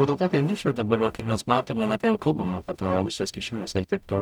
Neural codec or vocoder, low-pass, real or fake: codec, 44.1 kHz, 0.9 kbps, DAC; 19.8 kHz; fake